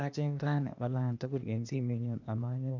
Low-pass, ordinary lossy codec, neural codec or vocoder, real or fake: 7.2 kHz; none; codec, 16 kHz, 0.8 kbps, ZipCodec; fake